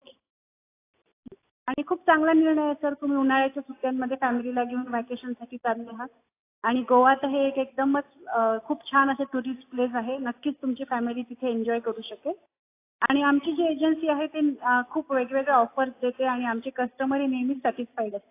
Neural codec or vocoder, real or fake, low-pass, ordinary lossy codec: none; real; 3.6 kHz; AAC, 24 kbps